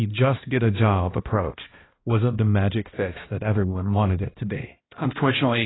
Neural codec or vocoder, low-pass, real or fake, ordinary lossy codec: codec, 16 kHz, 1 kbps, X-Codec, HuBERT features, trained on balanced general audio; 7.2 kHz; fake; AAC, 16 kbps